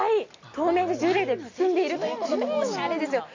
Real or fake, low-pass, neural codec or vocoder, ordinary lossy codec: real; 7.2 kHz; none; AAC, 48 kbps